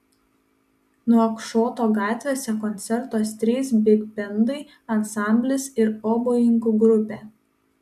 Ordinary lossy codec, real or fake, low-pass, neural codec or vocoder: MP3, 96 kbps; real; 14.4 kHz; none